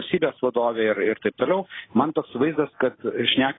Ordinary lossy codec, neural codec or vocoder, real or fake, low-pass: AAC, 16 kbps; none; real; 7.2 kHz